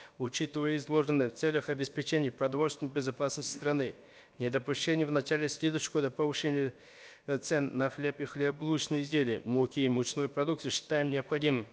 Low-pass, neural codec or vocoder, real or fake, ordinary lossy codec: none; codec, 16 kHz, about 1 kbps, DyCAST, with the encoder's durations; fake; none